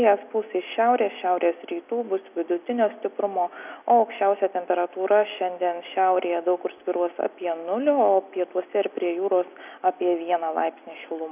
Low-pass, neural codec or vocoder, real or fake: 3.6 kHz; none; real